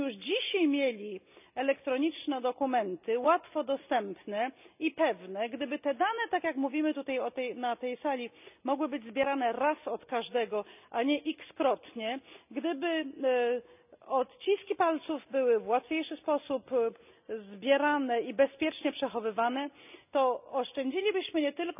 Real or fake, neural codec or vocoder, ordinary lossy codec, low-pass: real; none; none; 3.6 kHz